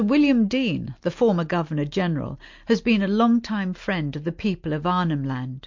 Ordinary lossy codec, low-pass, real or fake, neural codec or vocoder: MP3, 48 kbps; 7.2 kHz; real; none